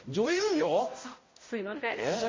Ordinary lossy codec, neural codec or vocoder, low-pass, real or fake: MP3, 32 kbps; codec, 16 kHz, 0.5 kbps, X-Codec, HuBERT features, trained on balanced general audio; 7.2 kHz; fake